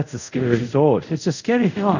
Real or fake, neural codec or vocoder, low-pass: fake; codec, 24 kHz, 0.5 kbps, DualCodec; 7.2 kHz